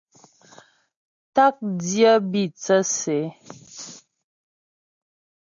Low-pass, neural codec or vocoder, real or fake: 7.2 kHz; none; real